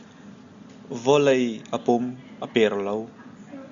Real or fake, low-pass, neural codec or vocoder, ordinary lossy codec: real; 7.2 kHz; none; Opus, 64 kbps